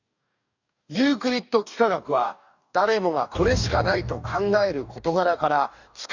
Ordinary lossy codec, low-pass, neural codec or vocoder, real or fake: none; 7.2 kHz; codec, 44.1 kHz, 2.6 kbps, DAC; fake